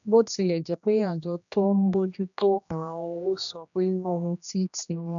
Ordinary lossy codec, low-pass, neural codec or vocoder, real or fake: none; 7.2 kHz; codec, 16 kHz, 1 kbps, X-Codec, HuBERT features, trained on general audio; fake